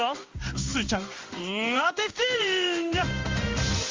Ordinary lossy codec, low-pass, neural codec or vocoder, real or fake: Opus, 32 kbps; 7.2 kHz; codec, 16 kHz in and 24 kHz out, 1 kbps, XY-Tokenizer; fake